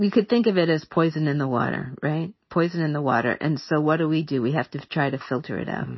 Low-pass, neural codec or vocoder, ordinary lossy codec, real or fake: 7.2 kHz; none; MP3, 24 kbps; real